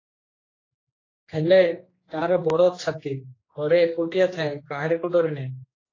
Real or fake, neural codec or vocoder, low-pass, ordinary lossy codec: fake; codec, 16 kHz, 2 kbps, X-Codec, HuBERT features, trained on general audio; 7.2 kHz; AAC, 32 kbps